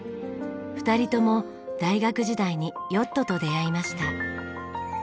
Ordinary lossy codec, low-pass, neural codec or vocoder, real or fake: none; none; none; real